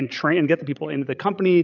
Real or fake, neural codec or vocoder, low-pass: fake; codec, 16 kHz, 16 kbps, FreqCodec, larger model; 7.2 kHz